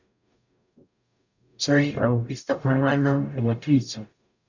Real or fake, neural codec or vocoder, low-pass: fake; codec, 44.1 kHz, 0.9 kbps, DAC; 7.2 kHz